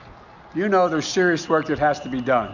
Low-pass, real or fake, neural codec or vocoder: 7.2 kHz; real; none